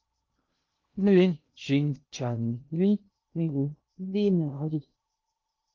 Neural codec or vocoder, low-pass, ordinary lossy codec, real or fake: codec, 16 kHz in and 24 kHz out, 0.6 kbps, FocalCodec, streaming, 2048 codes; 7.2 kHz; Opus, 24 kbps; fake